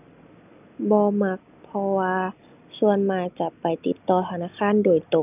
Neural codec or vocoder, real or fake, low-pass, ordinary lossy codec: none; real; 3.6 kHz; none